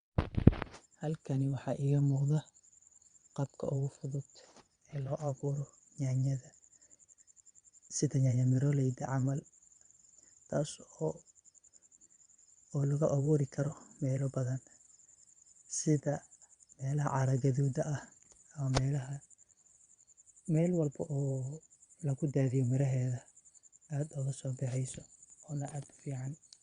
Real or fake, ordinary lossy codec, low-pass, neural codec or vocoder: fake; Opus, 64 kbps; 9.9 kHz; vocoder, 22.05 kHz, 80 mel bands, Vocos